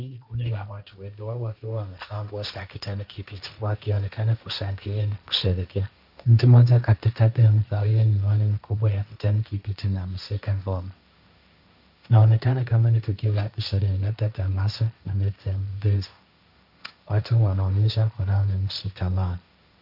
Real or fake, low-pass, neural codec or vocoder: fake; 5.4 kHz; codec, 16 kHz, 1.1 kbps, Voila-Tokenizer